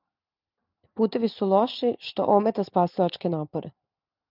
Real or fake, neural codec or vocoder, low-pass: real; none; 5.4 kHz